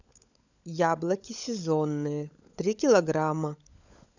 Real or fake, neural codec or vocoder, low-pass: fake; codec, 16 kHz, 16 kbps, FunCodec, trained on LibriTTS, 50 frames a second; 7.2 kHz